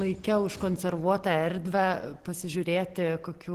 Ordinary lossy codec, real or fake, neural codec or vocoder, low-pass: Opus, 16 kbps; real; none; 14.4 kHz